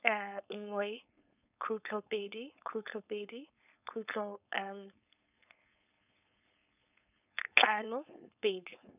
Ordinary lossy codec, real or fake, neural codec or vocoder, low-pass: none; fake; codec, 16 kHz, 4.8 kbps, FACodec; 3.6 kHz